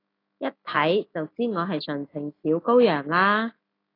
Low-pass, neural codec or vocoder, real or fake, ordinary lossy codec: 5.4 kHz; autoencoder, 48 kHz, 128 numbers a frame, DAC-VAE, trained on Japanese speech; fake; AAC, 24 kbps